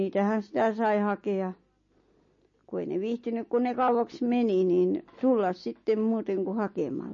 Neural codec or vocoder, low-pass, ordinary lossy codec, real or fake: none; 7.2 kHz; MP3, 32 kbps; real